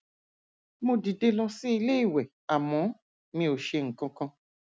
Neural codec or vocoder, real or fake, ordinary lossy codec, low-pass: none; real; none; none